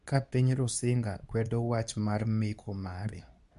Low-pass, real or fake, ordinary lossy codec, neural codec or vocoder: 10.8 kHz; fake; none; codec, 24 kHz, 0.9 kbps, WavTokenizer, medium speech release version 2